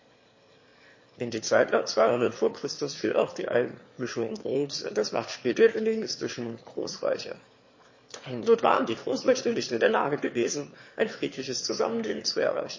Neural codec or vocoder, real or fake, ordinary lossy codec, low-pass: autoencoder, 22.05 kHz, a latent of 192 numbers a frame, VITS, trained on one speaker; fake; MP3, 32 kbps; 7.2 kHz